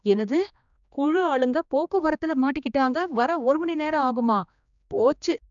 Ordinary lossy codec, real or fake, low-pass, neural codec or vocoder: none; fake; 7.2 kHz; codec, 16 kHz, 2 kbps, X-Codec, HuBERT features, trained on general audio